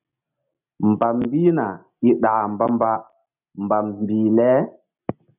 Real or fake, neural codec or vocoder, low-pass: real; none; 3.6 kHz